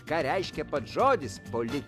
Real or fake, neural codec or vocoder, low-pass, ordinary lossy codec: real; none; 14.4 kHz; AAC, 96 kbps